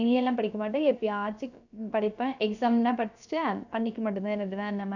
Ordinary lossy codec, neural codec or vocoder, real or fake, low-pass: none; codec, 16 kHz, about 1 kbps, DyCAST, with the encoder's durations; fake; 7.2 kHz